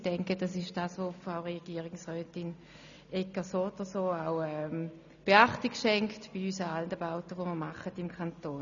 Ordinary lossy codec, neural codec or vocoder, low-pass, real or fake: none; none; 7.2 kHz; real